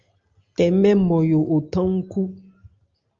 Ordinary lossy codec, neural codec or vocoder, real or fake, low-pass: Opus, 32 kbps; none; real; 7.2 kHz